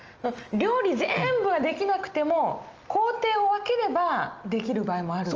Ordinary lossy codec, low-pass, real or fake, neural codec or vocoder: Opus, 24 kbps; 7.2 kHz; real; none